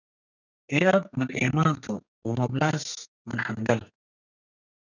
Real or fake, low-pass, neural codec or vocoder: fake; 7.2 kHz; codec, 32 kHz, 1.9 kbps, SNAC